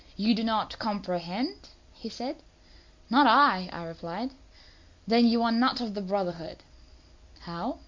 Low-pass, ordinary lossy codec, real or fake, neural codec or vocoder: 7.2 kHz; MP3, 48 kbps; real; none